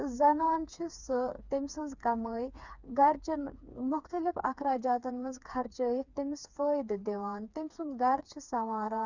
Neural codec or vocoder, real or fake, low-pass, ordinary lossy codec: codec, 16 kHz, 4 kbps, FreqCodec, smaller model; fake; 7.2 kHz; none